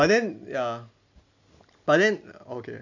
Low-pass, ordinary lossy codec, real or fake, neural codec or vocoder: 7.2 kHz; none; real; none